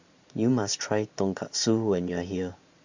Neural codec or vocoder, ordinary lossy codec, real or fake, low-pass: vocoder, 44.1 kHz, 128 mel bands every 512 samples, BigVGAN v2; Opus, 64 kbps; fake; 7.2 kHz